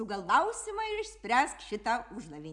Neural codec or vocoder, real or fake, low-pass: none; real; 10.8 kHz